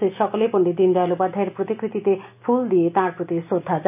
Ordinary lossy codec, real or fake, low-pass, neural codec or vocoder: none; real; 3.6 kHz; none